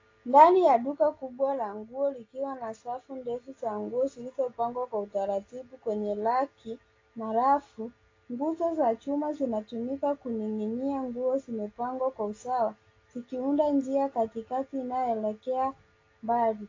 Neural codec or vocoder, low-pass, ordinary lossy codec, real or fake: none; 7.2 kHz; AAC, 32 kbps; real